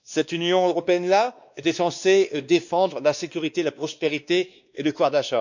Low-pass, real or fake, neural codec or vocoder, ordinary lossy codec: 7.2 kHz; fake; codec, 16 kHz, 2 kbps, X-Codec, WavLM features, trained on Multilingual LibriSpeech; none